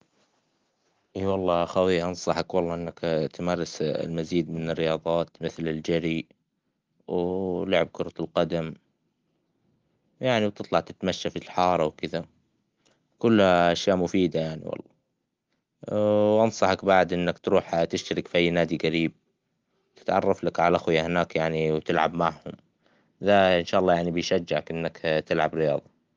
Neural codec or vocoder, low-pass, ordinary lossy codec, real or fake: none; 7.2 kHz; Opus, 32 kbps; real